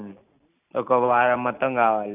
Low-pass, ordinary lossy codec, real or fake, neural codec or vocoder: 3.6 kHz; none; real; none